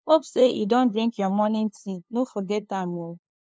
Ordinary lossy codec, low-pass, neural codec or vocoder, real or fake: none; none; codec, 16 kHz, 2 kbps, FunCodec, trained on LibriTTS, 25 frames a second; fake